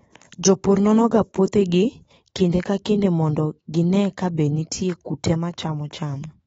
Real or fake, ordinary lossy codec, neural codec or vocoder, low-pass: fake; AAC, 24 kbps; autoencoder, 48 kHz, 128 numbers a frame, DAC-VAE, trained on Japanese speech; 19.8 kHz